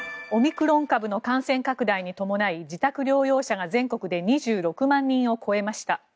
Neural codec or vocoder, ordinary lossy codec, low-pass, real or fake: none; none; none; real